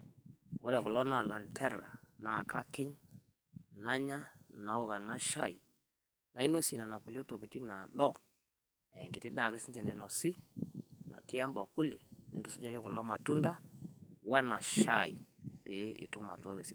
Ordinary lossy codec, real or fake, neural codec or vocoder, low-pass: none; fake; codec, 44.1 kHz, 2.6 kbps, SNAC; none